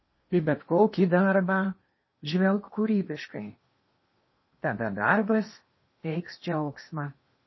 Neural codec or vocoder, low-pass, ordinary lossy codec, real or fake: codec, 16 kHz in and 24 kHz out, 0.8 kbps, FocalCodec, streaming, 65536 codes; 7.2 kHz; MP3, 24 kbps; fake